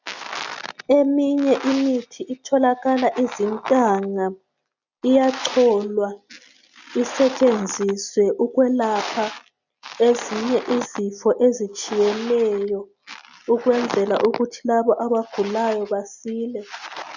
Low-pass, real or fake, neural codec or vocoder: 7.2 kHz; real; none